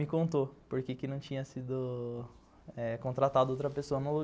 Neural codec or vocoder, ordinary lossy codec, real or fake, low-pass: none; none; real; none